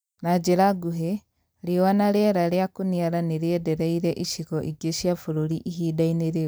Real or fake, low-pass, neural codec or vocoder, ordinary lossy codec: real; none; none; none